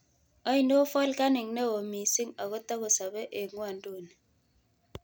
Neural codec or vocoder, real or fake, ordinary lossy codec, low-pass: none; real; none; none